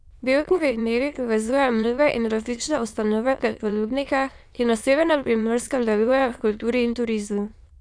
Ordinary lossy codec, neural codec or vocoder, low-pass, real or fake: none; autoencoder, 22.05 kHz, a latent of 192 numbers a frame, VITS, trained on many speakers; none; fake